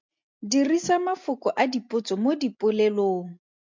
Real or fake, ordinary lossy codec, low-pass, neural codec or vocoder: real; MP3, 64 kbps; 7.2 kHz; none